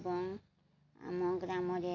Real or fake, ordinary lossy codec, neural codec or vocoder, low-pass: real; AAC, 48 kbps; none; 7.2 kHz